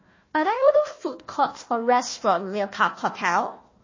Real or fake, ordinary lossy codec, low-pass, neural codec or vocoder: fake; MP3, 32 kbps; 7.2 kHz; codec, 16 kHz, 1 kbps, FunCodec, trained on Chinese and English, 50 frames a second